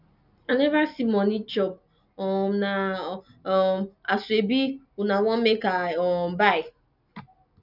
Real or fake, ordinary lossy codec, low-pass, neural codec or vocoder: real; none; 5.4 kHz; none